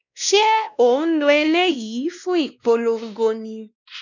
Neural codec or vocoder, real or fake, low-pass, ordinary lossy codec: codec, 16 kHz, 1 kbps, X-Codec, WavLM features, trained on Multilingual LibriSpeech; fake; 7.2 kHz; none